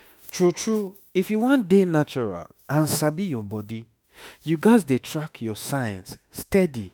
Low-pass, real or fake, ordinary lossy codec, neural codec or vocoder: none; fake; none; autoencoder, 48 kHz, 32 numbers a frame, DAC-VAE, trained on Japanese speech